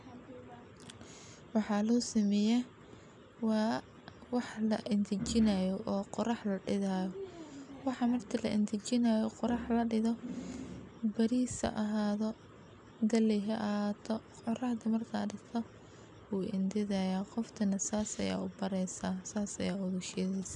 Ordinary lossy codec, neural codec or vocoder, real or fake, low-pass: none; none; real; 10.8 kHz